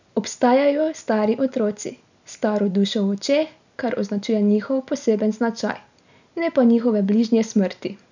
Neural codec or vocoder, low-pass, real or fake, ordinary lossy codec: none; 7.2 kHz; real; none